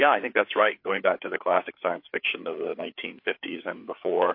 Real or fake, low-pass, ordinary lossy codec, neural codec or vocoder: fake; 5.4 kHz; MP3, 32 kbps; codec, 16 kHz, 4 kbps, FreqCodec, larger model